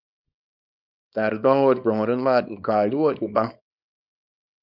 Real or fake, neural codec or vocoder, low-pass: fake; codec, 24 kHz, 0.9 kbps, WavTokenizer, small release; 5.4 kHz